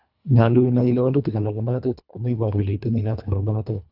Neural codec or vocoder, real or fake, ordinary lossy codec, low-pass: codec, 24 kHz, 1.5 kbps, HILCodec; fake; none; 5.4 kHz